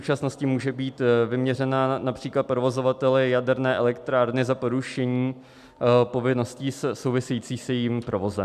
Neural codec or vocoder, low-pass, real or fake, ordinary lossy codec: none; 14.4 kHz; real; MP3, 96 kbps